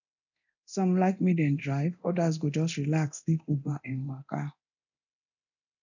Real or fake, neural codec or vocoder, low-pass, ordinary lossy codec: fake; codec, 24 kHz, 0.9 kbps, DualCodec; 7.2 kHz; none